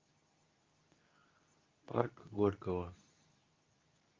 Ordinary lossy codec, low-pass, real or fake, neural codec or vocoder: Opus, 24 kbps; 7.2 kHz; fake; codec, 24 kHz, 0.9 kbps, WavTokenizer, medium speech release version 2